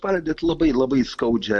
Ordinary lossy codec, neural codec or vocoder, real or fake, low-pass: MP3, 64 kbps; none; real; 10.8 kHz